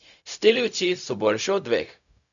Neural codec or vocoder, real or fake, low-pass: codec, 16 kHz, 0.4 kbps, LongCat-Audio-Codec; fake; 7.2 kHz